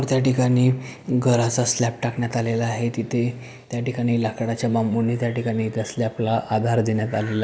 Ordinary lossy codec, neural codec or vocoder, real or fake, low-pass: none; none; real; none